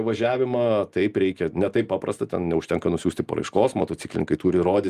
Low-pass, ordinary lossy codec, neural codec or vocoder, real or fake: 14.4 kHz; Opus, 32 kbps; none; real